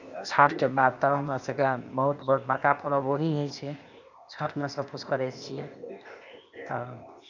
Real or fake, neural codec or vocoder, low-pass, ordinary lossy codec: fake; codec, 16 kHz, 0.8 kbps, ZipCodec; 7.2 kHz; none